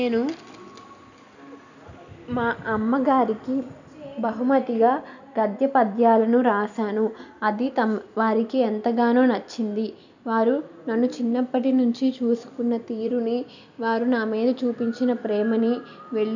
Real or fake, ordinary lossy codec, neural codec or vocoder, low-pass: real; none; none; 7.2 kHz